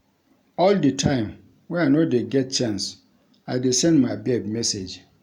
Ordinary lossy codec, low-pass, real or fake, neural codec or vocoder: none; 19.8 kHz; real; none